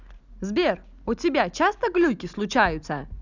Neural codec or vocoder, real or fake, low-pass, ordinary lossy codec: none; real; 7.2 kHz; none